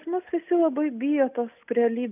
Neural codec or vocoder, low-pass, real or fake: none; 3.6 kHz; real